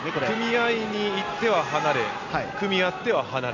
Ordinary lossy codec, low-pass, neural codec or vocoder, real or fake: none; 7.2 kHz; none; real